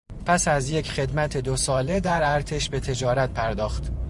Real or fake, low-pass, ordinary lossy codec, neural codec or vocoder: fake; 10.8 kHz; Opus, 64 kbps; vocoder, 44.1 kHz, 128 mel bands every 512 samples, BigVGAN v2